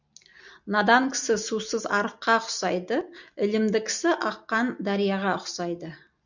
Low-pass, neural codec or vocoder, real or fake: 7.2 kHz; none; real